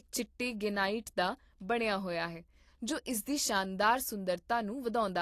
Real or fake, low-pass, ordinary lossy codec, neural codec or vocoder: real; 14.4 kHz; AAC, 48 kbps; none